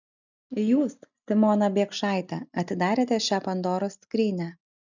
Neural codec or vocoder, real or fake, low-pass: none; real; 7.2 kHz